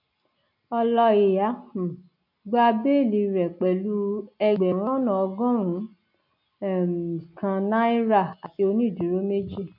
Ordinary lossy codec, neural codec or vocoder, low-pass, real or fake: none; none; 5.4 kHz; real